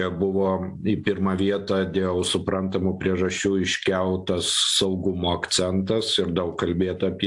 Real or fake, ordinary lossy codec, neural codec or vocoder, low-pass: real; AAC, 64 kbps; none; 10.8 kHz